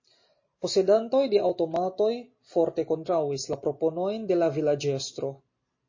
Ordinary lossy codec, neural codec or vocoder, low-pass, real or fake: MP3, 32 kbps; none; 7.2 kHz; real